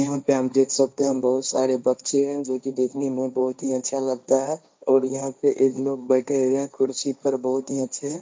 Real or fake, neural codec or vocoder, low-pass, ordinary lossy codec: fake; codec, 16 kHz, 1.1 kbps, Voila-Tokenizer; none; none